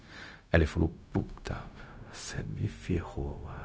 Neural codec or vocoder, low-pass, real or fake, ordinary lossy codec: codec, 16 kHz, 0.4 kbps, LongCat-Audio-Codec; none; fake; none